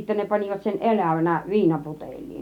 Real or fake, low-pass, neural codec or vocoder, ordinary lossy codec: real; 19.8 kHz; none; none